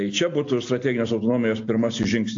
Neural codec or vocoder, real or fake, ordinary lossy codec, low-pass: none; real; AAC, 48 kbps; 7.2 kHz